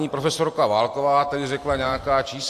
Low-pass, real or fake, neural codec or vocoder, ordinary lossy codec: 14.4 kHz; fake; vocoder, 44.1 kHz, 128 mel bands every 512 samples, BigVGAN v2; AAC, 64 kbps